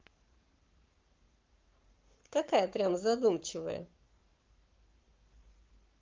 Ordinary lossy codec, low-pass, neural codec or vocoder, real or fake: Opus, 32 kbps; 7.2 kHz; codec, 44.1 kHz, 7.8 kbps, Pupu-Codec; fake